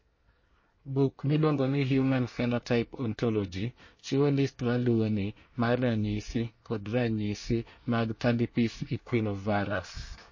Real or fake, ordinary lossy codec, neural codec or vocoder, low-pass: fake; MP3, 32 kbps; codec, 24 kHz, 1 kbps, SNAC; 7.2 kHz